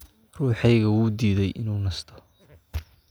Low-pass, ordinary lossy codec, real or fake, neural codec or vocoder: none; none; real; none